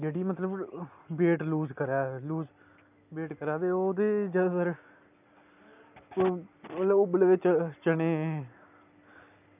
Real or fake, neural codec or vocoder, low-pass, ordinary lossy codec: real; none; 3.6 kHz; none